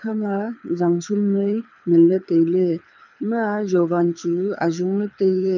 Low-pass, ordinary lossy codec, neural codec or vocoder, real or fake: 7.2 kHz; none; codec, 24 kHz, 6 kbps, HILCodec; fake